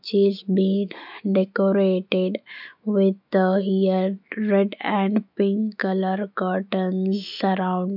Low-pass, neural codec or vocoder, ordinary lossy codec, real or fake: 5.4 kHz; autoencoder, 48 kHz, 128 numbers a frame, DAC-VAE, trained on Japanese speech; none; fake